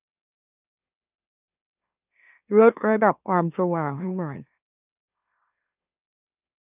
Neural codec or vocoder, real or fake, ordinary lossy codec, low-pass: autoencoder, 44.1 kHz, a latent of 192 numbers a frame, MeloTTS; fake; none; 3.6 kHz